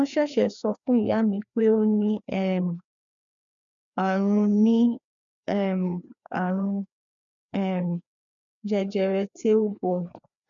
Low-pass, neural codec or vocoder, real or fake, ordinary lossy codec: 7.2 kHz; codec, 16 kHz, 2 kbps, FreqCodec, larger model; fake; MP3, 96 kbps